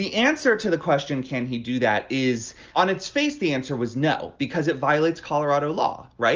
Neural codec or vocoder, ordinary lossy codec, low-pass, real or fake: none; Opus, 24 kbps; 7.2 kHz; real